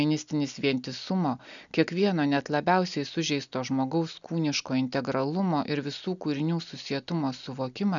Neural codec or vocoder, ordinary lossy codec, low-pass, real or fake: none; MP3, 96 kbps; 7.2 kHz; real